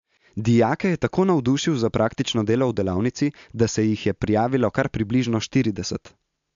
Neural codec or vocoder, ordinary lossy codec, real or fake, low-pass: none; MP3, 64 kbps; real; 7.2 kHz